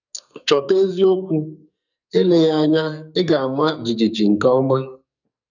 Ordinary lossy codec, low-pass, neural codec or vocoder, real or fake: none; 7.2 kHz; codec, 44.1 kHz, 2.6 kbps, SNAC; fake